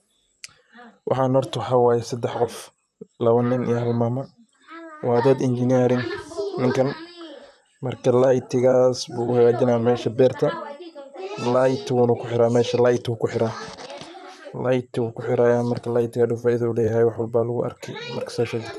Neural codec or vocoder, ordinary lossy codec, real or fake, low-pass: vocoder, 44.1 kHz, 128 mel bands, Pupu-Vocoder; none; fake; 14.4 kHz